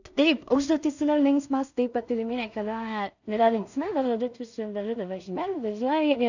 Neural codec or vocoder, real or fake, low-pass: codec, 16 kHz in and 24 kHz out, 0.4 kbps, LongCat-Audio-Codec, two codebook decoder; fake; 7.2 kHz